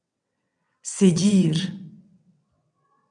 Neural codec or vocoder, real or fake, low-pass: vocoder, 22.05 kHz, 80 mel bands, WaveNeXt; fake; 9.9 kHz